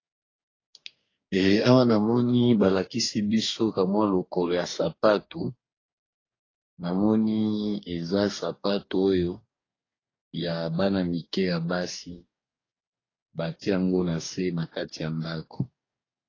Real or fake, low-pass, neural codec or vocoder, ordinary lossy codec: fake; 7.2 kHz; codec, 44.1 kHz, 2.6 kbps, DAC; AAC, 32 kbps